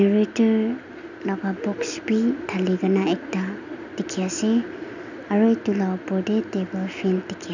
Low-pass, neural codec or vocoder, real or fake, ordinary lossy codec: 7.2 kHz; none; real; none